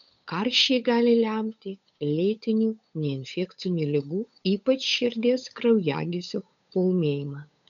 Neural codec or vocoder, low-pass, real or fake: codec, 16 kHz, 8 kbps, FunCodec, trained on Chinese and English, 25 frames a second; 7.2 kHz; fake